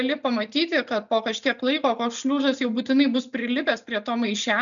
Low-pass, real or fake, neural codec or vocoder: 7.2 kHz; real; none